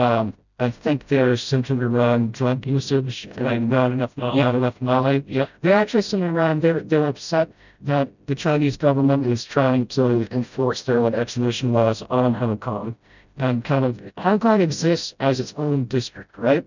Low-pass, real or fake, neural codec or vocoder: 7.2 kHz; fake; codec, 16 kHz, 0.5 kbps, FreqCodec, smaller model